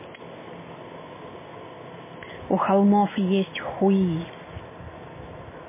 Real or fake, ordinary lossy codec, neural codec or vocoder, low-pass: real; MP3, 16 kbps; none; 3.6 kHz